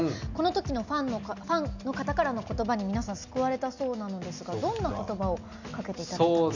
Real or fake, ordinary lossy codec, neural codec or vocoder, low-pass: real; none; none; 7.2 kHz